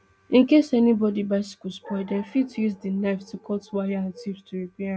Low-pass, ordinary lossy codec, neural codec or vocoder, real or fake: none; none; none; real